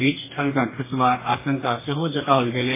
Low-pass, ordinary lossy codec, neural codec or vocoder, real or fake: 3.6 kHz; MP3, 16 kbps; codec, 44.1 kHz, 2.6 kbps, DAC; fake